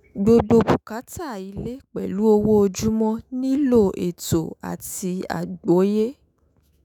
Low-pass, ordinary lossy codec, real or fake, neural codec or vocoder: 19.8 kHz; none; fake; autoencoder, 48 kHz, 128 numbers a frame, DAC-VAE, trained on Japanese speech